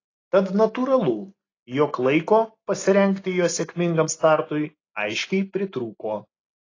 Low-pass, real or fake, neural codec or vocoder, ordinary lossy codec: 7.2 kHz; real; none; AAC, 32 kbps